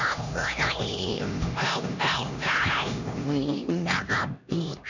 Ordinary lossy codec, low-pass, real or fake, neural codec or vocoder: none; 7.2 kHz; fake; codec, 16 kHz, 1 kbps, X-Codec, HuBERT features, trained on LibriSpeech